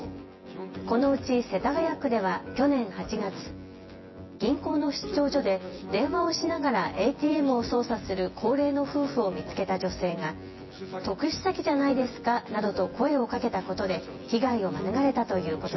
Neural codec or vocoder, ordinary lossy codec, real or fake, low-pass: vocoder, 24 kHz, 100 mel bands, Vocos; MP3, 24 kbps; fake; 7.2 kHz